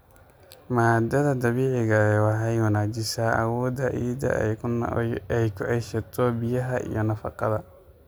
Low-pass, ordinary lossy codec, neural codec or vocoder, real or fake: none; none; none; real